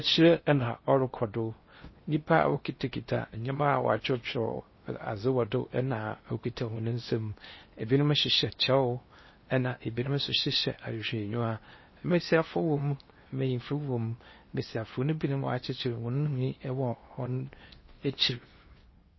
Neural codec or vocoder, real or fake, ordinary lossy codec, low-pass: codec, 16 kHz in and 24 kHz out, 0.8 kbps, FocalCodec, streaming, 65536 codes; fake; MP3, 24 kbps; 7.2 kHz